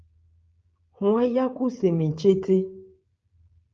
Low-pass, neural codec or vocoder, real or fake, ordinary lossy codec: 7.2 kHz; codec, 16 kHz, 16 kbps, FreqCodec, smaller model; fake; Opus, 24 kbps